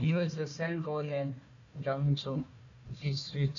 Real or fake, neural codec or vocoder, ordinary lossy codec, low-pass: fake; codec, 16 kHz, 1 kbps, FunCodec, trained on Chinese and English, 50 frames a second; MP3, 96 kbps; 7.2 kHz